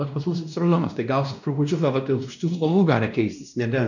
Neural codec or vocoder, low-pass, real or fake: codec, 16 kHz, 1 kbps, X-Codec, WavLM features, trained on Multilingual LibriSpeech; 7.2 kHz; fake